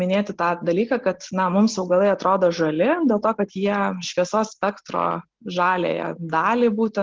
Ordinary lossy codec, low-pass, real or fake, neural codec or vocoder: Opus, 16 kbps; 7.2 kHz; real; none